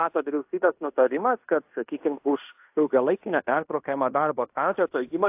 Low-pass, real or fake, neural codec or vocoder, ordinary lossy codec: 3.6 kHz; fake; codec, 16 kHz in and 24 kHz out, 0.9 kbps, LongCat-Audio-Codec, fine tuned four codebook decoder; AAC, 32 kbps